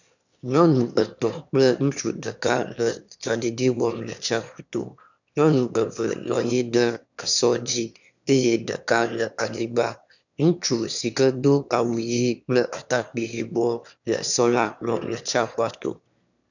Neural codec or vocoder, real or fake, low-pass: autoencoder, 22.05 kHz, a latent of 192 numbers a frame, VITS, trained on one speaker; fake; 7.2 kHz